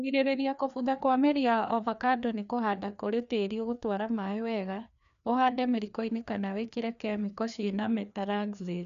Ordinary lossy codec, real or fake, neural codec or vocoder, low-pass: none; fake; codec, 16 kHz, 2 kbps, FreqCodec, larger model; 7.2 kHz